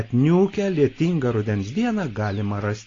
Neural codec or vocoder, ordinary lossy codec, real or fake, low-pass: none; AAC, 32 kbps; real; 7.2 kHz